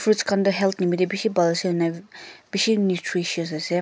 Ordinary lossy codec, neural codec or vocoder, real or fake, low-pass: none; none; real; none